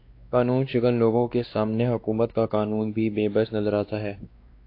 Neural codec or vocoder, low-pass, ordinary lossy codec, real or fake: codec, 16 kHz, 2 kbps, X-Codec, WavLM features, trained on Multilingual LibriSpeech; 5.4 kHz; AAC, 32 kbps; fake